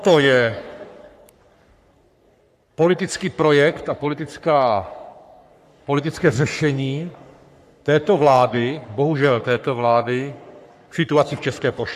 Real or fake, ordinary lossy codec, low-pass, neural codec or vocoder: fake; AAC, 96 kbps; 14.4 kHz; codec, 44.1 kHz, 3.4 kbps, Pupu-Codec